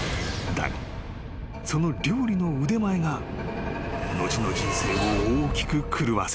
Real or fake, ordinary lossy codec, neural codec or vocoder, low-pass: real; none; none; none